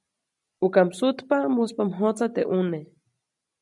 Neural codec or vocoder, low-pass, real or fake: none; 10.8 kHz; real